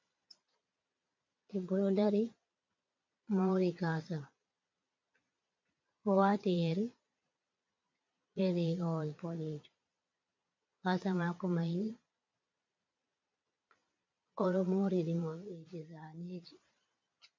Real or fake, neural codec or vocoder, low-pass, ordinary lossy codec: fake; vocoder, 22.05 kHz, 80 mel bands, Vocos; 7.2 kHz; MP3, 48 kbps